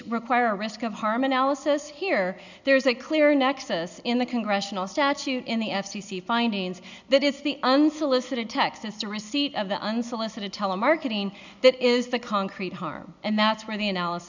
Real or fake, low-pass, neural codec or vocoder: real; 7.2 kHz; none